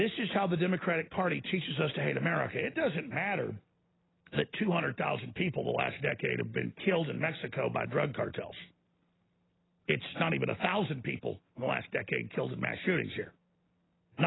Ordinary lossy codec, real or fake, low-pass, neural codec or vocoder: AAC, 16 kbps; real; 7.2 kHz; none